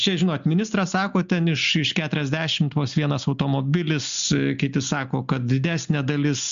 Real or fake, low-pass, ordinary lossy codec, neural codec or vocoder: real; 7.2 kHz; MP3, 64 kbps; none